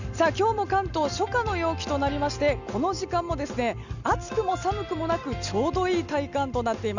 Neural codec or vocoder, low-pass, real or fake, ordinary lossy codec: none; 7.2 kHz; real; none